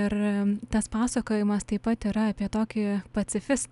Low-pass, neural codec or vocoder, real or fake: 10.8 kHz; none; real